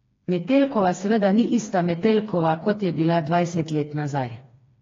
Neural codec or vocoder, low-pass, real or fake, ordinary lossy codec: codec, 16 kHz, 2 kbps, FreqCodec, smaller model; 7.2 kHz; fake; AAC, 32 kbps